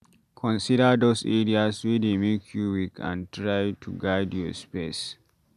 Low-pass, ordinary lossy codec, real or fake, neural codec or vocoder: 14.4 kHz; none; real; none